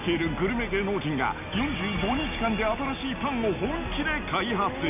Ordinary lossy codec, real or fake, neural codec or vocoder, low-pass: none; real; none; 3.6 kHz